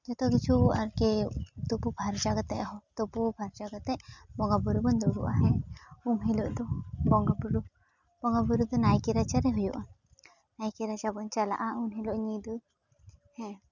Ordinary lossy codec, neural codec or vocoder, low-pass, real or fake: none; none; 7.2 kHz; real